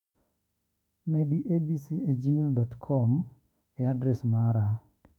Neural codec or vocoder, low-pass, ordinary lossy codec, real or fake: autoencoder, 48 kHz, 32 numbers a frame, DAC-VAE, trained on Japanese speech; 19.8 kHz; none; fake